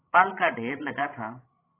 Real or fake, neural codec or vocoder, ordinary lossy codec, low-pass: fake; codec, 16 kHz, 16 kbps, FreqCodec, larger model; AAC, 24 kbps; 3.6 kHz